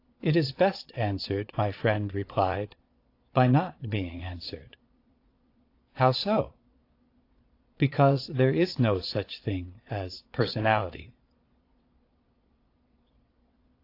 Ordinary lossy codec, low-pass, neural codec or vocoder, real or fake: AAC, 32 kbps; 5.4 kHz; vocoder, 22.05 kHz, 80 mel bands, Vocos; fake